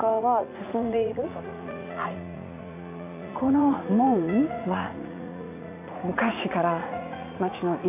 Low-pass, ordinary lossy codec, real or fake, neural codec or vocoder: 3.6 kHz; AAC, 32 kbps; fake; vocoder, 44.1 kHz, 80 mel bands, Vocos